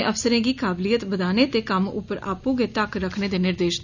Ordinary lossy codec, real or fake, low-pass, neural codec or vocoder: none; real; 7.2 kHz; none